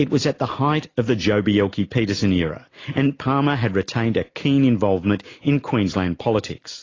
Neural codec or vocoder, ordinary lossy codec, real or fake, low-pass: none; AAC, 32 kbps; real; 7.2 kHz